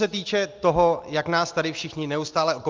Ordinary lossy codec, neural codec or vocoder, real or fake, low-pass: Opus, 32 kbps; none; real; 7.2 kHz